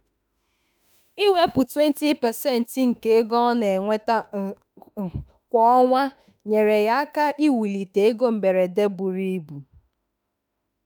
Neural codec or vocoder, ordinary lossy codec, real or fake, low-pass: autoencoder, 48 kHz, 32 numbers a frame, DAC-VAE, trained on Japanese speech; none; fake; none